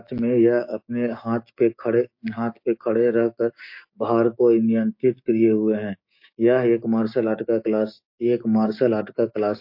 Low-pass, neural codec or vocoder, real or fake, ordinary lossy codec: 5.4 kHz; codec, 16 kHz, 6 kbps, DAC; fake; MP3, 32 kbps